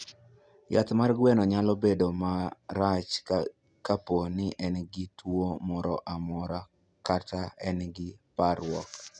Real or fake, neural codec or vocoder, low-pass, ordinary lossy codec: real; none; 9.9 kHz; none